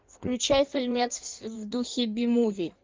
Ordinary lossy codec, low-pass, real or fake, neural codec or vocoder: Opus, 32 kbps; 7.2 kHz; fake; codec, 16 kHz in and 24 kHz out, 1.1 kbps, FireRedTTS-2 codec